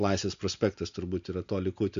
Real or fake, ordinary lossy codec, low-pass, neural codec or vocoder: real; MP3, 64 kbps; 7.2 kHz; none